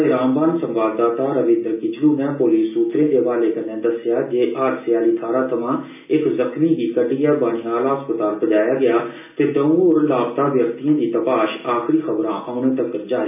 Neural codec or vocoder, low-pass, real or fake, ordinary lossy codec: none; 3.6 kHz; real; none